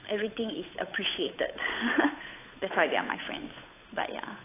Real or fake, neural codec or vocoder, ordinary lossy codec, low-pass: fake; codec, 16 kHz, 8 kbps, FunCodec, trained on Chinese and English, 25 frames a second; AAC, 16 kbps; 3.6 kHz